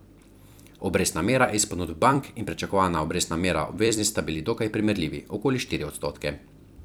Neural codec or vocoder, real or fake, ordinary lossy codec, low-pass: vocoder, 44.1 kHz, 128 mel bands every 256 samples, BigVGAN v2; fake; none; none